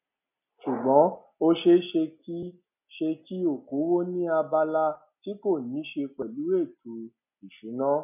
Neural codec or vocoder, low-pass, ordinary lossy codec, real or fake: none; 3.6 kHz; none; real